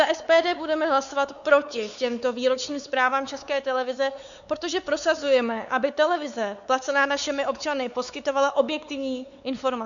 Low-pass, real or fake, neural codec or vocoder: 7.2 kHz; fake; codec, 16 kHz, 4 kbps, X-Codec, WavLM features, trained on Multilingual LibriSpeech